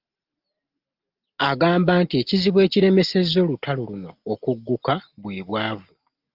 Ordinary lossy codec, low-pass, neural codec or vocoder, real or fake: Opus, 24 kbps; 5.4 kHz; none; real